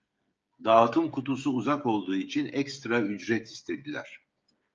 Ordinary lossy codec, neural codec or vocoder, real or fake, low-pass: Opus, 24 kbps; codec, 16 kHz, 16 kbps, FreqCodec, smaller model; fake; 7.2 kHz